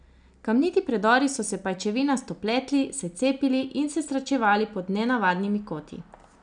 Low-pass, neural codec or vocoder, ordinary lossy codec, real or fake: 9.9 kHz; none; none; real